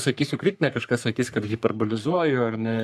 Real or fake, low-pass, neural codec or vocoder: fake; 14.4 kHz; codec, 44.1 kHz, 3.4 kbps, Pupu-Codec